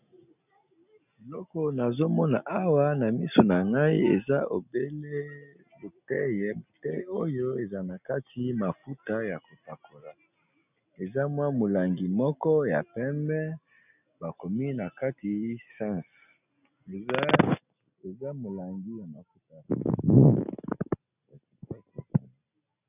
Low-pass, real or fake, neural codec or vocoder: 3.6 kHz; real; none